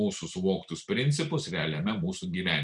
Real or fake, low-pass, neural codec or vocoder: real; 10.8 kHz; none